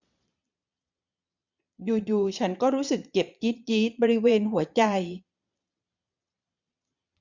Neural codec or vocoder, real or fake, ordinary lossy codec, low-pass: vocoder, 22.05 kHz, 80 mel bands, Vocos; fake; none; 7.2 kHz